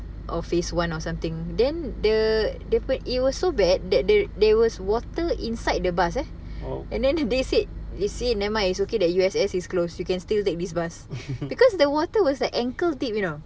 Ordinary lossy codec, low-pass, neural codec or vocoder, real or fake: none; none; none; real